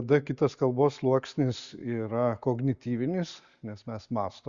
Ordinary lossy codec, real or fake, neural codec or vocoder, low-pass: Opus, 64 kbps; real; none; 7.2 kHz